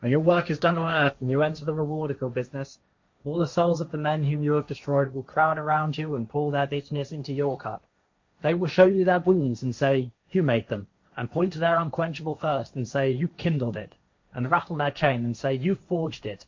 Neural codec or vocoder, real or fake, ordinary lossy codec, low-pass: codec, 16 kHz, 1.1 kbps, Voila-Tokenizer; fake; MP3, 48 kbps; 7.2 kHz